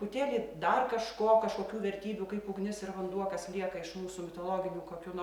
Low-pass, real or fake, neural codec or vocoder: 19.8 kHz; real; none